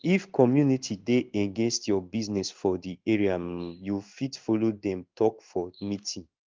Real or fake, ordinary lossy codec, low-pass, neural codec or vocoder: fake; Opus, 32 kbps; 7.2 kHz; codec, 16 kHz in and 24 kHz out, 1 kbps, XY-Tokenizer